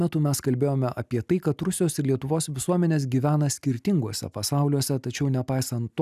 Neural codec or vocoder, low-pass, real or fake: none; 14.4 kHz; real